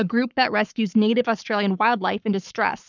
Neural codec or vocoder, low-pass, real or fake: codec, 44.1 kHz, 7.8 kbps, Pupu-Codec; 7.2 kHz; fake